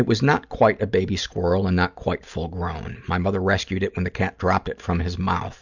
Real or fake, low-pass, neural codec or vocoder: real; 7.2 kHz; none